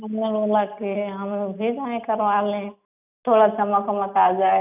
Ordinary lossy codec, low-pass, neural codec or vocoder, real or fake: none; 3.6 kHz; none; real